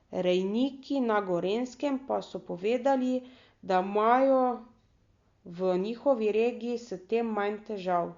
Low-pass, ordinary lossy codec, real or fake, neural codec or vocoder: 7.2 kHz; Opus, 64 kbps; real; none